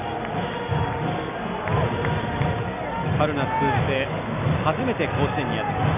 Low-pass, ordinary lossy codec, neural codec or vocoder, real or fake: 3.6 kHz; none; none; real